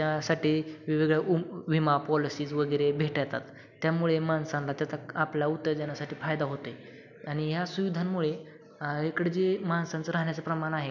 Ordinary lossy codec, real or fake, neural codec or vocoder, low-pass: none; real; none; 7.2 kHz